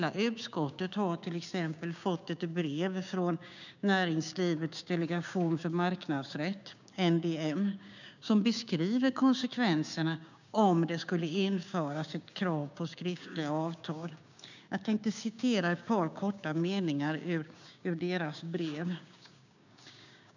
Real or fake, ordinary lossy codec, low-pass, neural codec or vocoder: fake; none; 7.2 kHz; codec, 16 kHz, 6 kbps, DAC